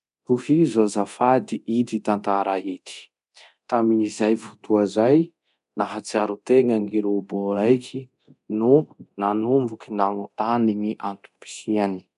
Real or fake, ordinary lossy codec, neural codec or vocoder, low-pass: fake; none; codec, 24 kHz, 0.9 kbps, DualCodec; 10.8 kHz